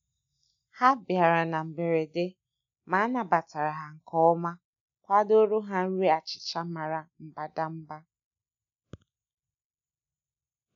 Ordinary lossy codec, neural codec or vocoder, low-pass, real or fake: MP3, 96 kbps; none; 7.2 kHz; real